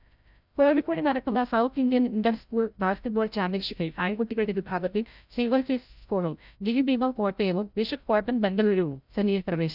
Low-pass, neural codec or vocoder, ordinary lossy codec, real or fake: 5.4 kHz; codec, 16 kHz, 0.5 kbps, FreqCodec, larger model; none; fake